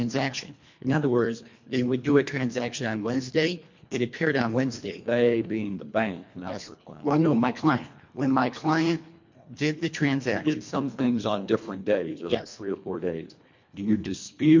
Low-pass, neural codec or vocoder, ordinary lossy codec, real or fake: 7.2 kHz; codec, 24 kHz, 1.5 kbps, HILCodec; MP3, 48 kbps; fake